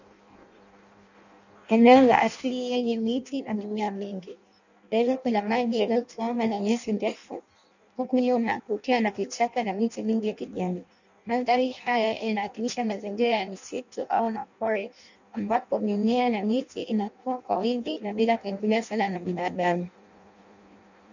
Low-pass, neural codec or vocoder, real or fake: 7.2 kHz; codec, 16 kHz in and 24 kHz out, 0.6 kbps, FireRedTTS-2 codec; fake